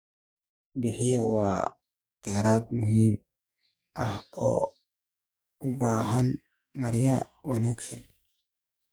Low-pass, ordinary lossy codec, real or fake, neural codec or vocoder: none; none; fake; codec, 44.1 kHz, 2.6 kbps, DAC